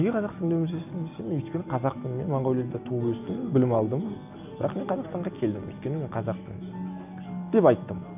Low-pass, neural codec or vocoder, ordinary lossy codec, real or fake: 3.6 kHz; none; none; real